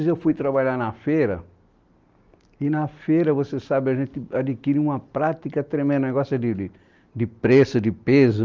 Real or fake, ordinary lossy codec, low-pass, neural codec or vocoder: real; Opus, 24 kbps; 7.2 kHz; none